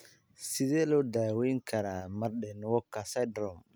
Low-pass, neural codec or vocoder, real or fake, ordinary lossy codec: none; none; real; none